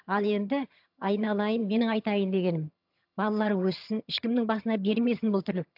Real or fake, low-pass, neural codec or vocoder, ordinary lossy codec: fake; 5.4 kHz; vocoder, 22.05 kHz, 80 mel bands, HiFi-GAN; AAC, 48 kbps